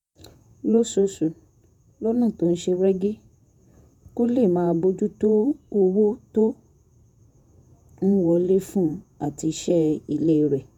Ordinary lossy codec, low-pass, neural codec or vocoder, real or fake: none; 19.8 kHz; vocoder, 48 kHz, 128 mel bands, Vocos; fake